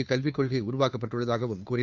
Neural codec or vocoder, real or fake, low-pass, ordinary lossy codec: codec, 16 kHz, 2 kbps, FunCodec, trained on Chinese and English, 25 frames a second; fake; 7.2 kHz; none